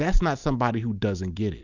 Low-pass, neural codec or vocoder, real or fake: 7.2 kHz; none; real